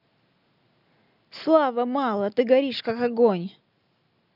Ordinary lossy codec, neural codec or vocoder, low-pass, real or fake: none; none; 5.4 kHz; real